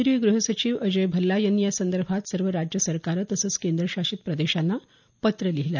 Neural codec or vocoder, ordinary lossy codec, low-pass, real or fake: none; none; 7.2 kHz; real